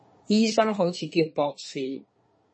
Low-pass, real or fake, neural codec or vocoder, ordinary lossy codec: 9.9 kHz; fake; codec, 24 kHz, 1 kbps, SNAC; MP3, 32 kbps